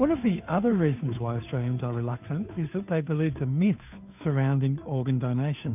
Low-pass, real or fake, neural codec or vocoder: 3.6 kHz; fake; codec, 16 kHz, 2 kbps, FunCodec, trained on Chinese and English, 25 frames a second